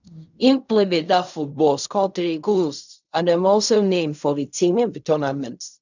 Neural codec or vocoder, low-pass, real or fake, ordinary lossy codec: codec, 16 kHz in and 24 kHz out, 0.4 kbps, LongCat-Audio-Codec, fine tuned four codebook decoder; 7.2 kHz; fake; none